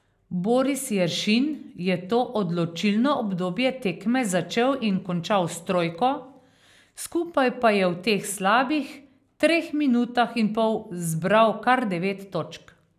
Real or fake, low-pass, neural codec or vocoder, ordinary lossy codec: real; 14.4 kHz; none; none